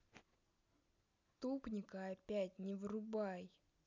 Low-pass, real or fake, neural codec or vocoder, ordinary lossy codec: 7.2 kHz; real; none; none